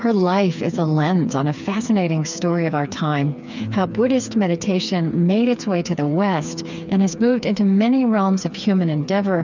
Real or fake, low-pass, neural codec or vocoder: fake; 7.2 kHz; codec, 16 kHz, 4 kbps, FreqCodec, smaller model